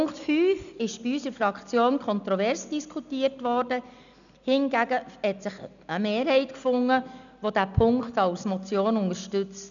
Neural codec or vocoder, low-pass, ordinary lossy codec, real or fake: none; 7.2 kHz; none; real